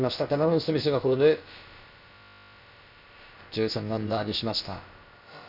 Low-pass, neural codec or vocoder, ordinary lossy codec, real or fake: 5.4 kHz; codec, 16 kHz, about 1 kbps, DyCAST, with the encoder's durations; none; fake